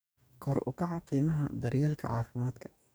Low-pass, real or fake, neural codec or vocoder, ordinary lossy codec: none; fake; codec, 44.1 kHz, 2.6 kbps, DAC; none